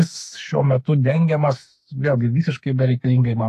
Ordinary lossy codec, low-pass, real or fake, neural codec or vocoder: AAC, 48 kbps; 14.4 kHz; fake; autoencoder, 48 kHz, 32 numbers a frame, DAC-VAE, trained on Japanese speech